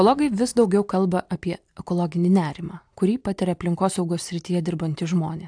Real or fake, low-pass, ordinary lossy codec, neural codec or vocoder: real; 9.9 kHz; AAC, 64 kbps; none